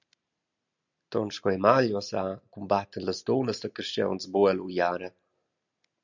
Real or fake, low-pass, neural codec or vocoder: real; 7.2 kHz; none